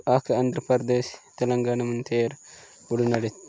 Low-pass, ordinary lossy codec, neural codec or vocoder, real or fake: none; none; none; real